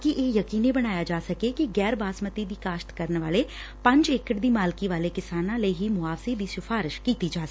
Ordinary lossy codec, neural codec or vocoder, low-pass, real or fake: none; none; none; real